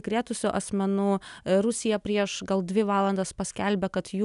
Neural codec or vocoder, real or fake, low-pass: none; real; 10.8 kHz